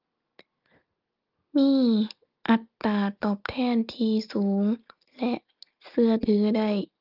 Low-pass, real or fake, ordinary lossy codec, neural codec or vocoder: 5.4 kHz; real; Opus, 24 kbps; none